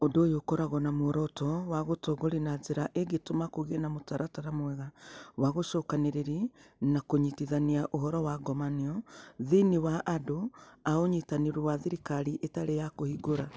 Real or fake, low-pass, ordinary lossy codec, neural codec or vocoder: real; none; none; none